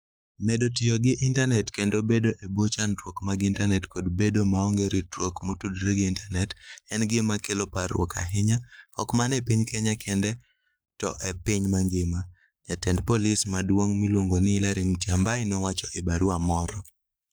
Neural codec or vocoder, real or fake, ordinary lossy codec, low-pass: codec, 44.1 kHz, 7.8 kbps, Pupu-Codec; fake; none; none